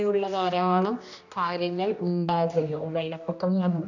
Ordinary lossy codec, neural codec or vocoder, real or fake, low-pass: none; codec, 16 kHz, 1 kbps, X-Codec, HuBERT features, trained on general audio; fake; 7.2 kHz